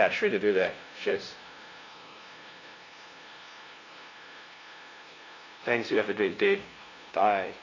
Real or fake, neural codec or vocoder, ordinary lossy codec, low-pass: fake; codec, 16 kHz, 0.5 kbps, FunCodec, trained on LibriTTS, 25 frames a second; AAC, 32 kbps; 7.2 kHz